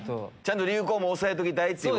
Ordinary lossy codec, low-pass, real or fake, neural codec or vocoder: none; none; real; none